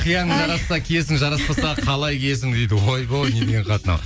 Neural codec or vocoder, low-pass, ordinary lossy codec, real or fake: none; none; none; real